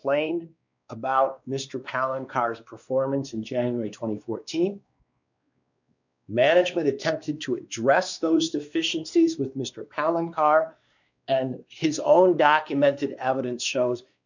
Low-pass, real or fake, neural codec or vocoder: 7.2 kHz; fake; codec, 16 kHz, 2 kbps, X-Codec, WavLM features, trained on Multilingual LibriSpeech